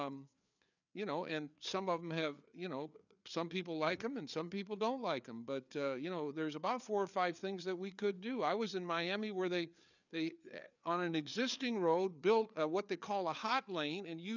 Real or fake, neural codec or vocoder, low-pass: fake; codec, 16 kHz, 4 kbps, FreqCodec, larger model; 7.2 kHz